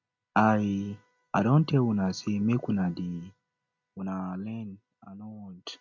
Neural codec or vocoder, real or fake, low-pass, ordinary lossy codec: none; real; 7.2 kHz; none